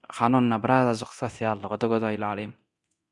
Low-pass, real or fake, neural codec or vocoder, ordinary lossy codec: 10.8 kHz; fake; codec, 24 kHz, 0.9 kbps, DualCodec; Opus, 64 kbps